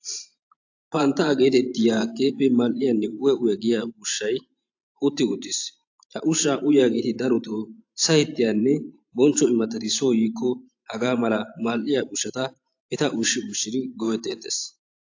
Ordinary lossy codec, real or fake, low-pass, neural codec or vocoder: AAC, 48 kbps; fake; 7.2 kHz; vocoder, 44.1 kHz, 128 mel bands every 512 samples, BigVGAN v2